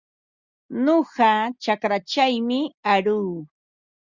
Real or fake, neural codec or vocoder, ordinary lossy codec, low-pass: real; none; Opus, 64 kbps; 7.2 kHz